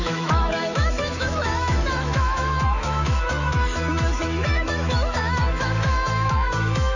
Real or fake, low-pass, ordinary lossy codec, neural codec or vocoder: fake; 7.2 kHz; none; autoencoder, 48 kHz, 128 numbers a frame, DAC-VAE, trained on Japanese speech